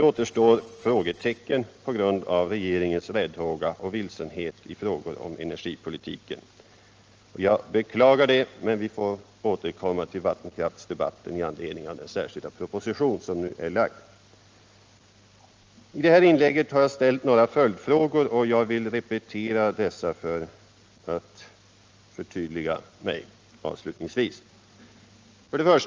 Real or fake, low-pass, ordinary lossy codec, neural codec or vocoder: real; 7.2 kHz; Opus, 24 kbps; none